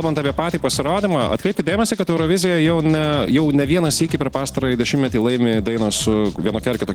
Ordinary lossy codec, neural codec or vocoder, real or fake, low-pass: Opus, 16 kbps; none; real; 19.8 kHz